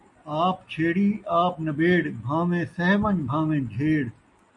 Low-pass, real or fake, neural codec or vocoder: 10.8 kHz; real; none